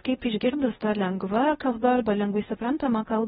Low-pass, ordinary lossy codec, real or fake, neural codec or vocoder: 10.8 kHz; AAC, 16 kbps; fake; codec, 16 kHz in and 24 kHz out, 0.6 kbps, FocalCodec, streaming, 2048 codes